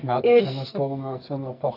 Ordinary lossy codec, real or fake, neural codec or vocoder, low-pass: AAC, 32 kbps; fake; codec, 44.1 kHz, 2.6 kbps, SNAC; 5.4 kHz